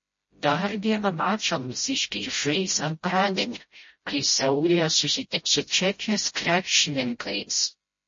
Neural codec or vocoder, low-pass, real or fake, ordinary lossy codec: codec, 16 kHz, 0.5 kbps, FreqCodec, smaller model; 7.2 kHz; fake; MP3, 32 kbps